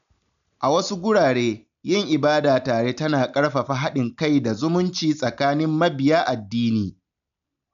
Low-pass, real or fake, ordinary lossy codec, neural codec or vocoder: 7.2 kHz; real; none; none